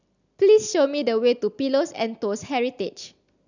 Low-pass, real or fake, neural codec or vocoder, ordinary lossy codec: 7.2 kHz; real; none; none